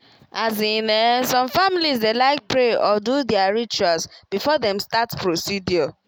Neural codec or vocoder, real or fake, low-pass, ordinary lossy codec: none; real; none; none